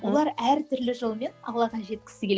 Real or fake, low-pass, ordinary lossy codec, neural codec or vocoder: real; none; none; none